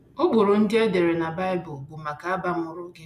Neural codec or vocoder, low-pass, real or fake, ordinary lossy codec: none; 14.4 kHz; real; none